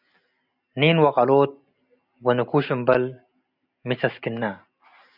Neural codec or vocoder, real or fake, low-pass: none; real; 5.4 kHz